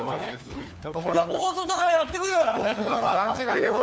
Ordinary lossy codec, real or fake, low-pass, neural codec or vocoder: none; fake; none; codec, 16 kHz, 4 kbps, FunCodec, trained on LibriTTS, 50 frames a second